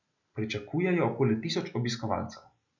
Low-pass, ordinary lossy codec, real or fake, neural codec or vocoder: 7.2 kHz; none; real; none